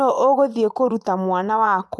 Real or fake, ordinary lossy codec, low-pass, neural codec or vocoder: fake; none; none; vocoder, 24 kHz, 100 mel bands, Vocos